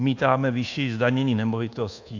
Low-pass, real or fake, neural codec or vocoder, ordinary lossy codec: 7.2 kHz; fake; codec, 24 kHz, 1.2 kbps, DualCodec; AAC, 48 kbps